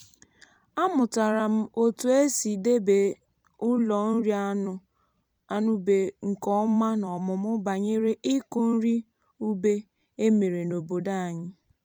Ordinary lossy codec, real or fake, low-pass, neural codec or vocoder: none; fake; 19.8 kHz; vocoder, 44.1 kHz, 128 mel bands every 256 samples, BigVGAN v2